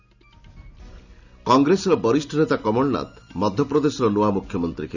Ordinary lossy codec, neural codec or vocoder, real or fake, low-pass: none; none; real; 7.2 kHz